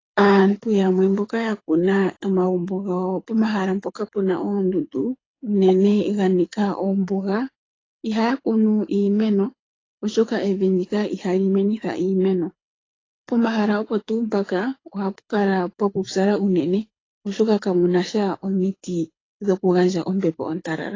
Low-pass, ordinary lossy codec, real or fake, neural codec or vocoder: 7.2 kHz; AAC, 32 kbps; fake; codec, 24 kHz, 6 kbps, HILCodec